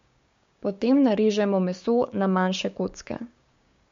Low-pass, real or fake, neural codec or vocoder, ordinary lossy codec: 7.2 kHz; fake; codec, 16 kHz, 6 kbps, DAC; MP3, 48 kbps